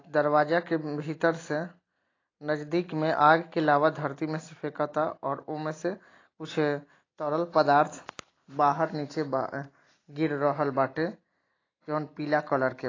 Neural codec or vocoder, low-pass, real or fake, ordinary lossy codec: none; 7.2 kHz; real; AAC, 32 kbps